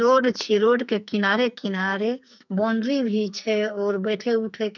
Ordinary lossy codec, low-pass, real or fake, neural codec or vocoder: none; 7.2 kHz; fake; codec, 44.1 kHz, 2.6 kbps, SNAC